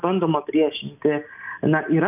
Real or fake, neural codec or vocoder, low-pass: real; none; 3.6 kHz